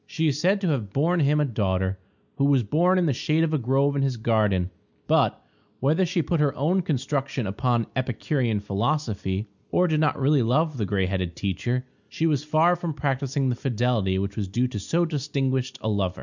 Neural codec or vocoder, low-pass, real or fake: none; 7.2 kHz; real